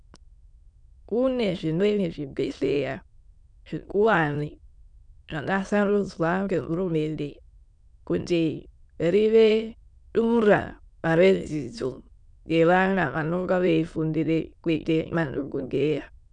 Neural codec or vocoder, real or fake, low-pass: autoencoder, 22.05 kHz, a latent of 192 numbers a frame, VITS, trained on many speakers; fake; 9.9 kHz